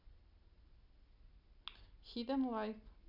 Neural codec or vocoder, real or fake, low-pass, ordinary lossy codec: none; real; 5.4 kHz; none